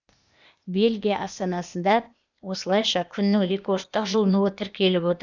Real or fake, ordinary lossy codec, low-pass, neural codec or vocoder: fake; none; 7.2 kHz; codec, 16 kHz, 0.8 kbps, ZipCodec